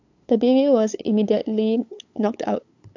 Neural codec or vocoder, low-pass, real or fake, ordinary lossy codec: codec, 16 kHz, 8 kbps, FunCodec, trained on LibriTTS, 25 frames a second; 7.2 kHz; fake; AAC, 48 kbps